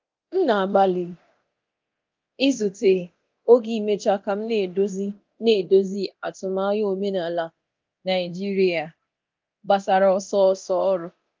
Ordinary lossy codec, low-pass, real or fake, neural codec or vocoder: Opus, 32 kbps; 7.2 kHz; fake; codec, 24 kHz, 0.9 kbps, DualCodec